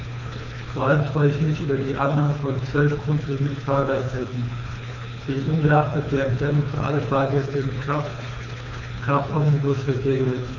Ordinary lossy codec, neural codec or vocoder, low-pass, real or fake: none; codec, 24 kHz, 3 kbps, HILCodec; 7.2 kHz; fake